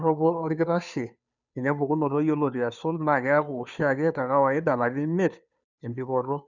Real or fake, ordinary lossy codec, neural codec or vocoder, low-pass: fake; none; codec, 16 kHz, 2 kbps, FunCodec, trained on Chinese and English, 25 frames a second; 7.2 kHz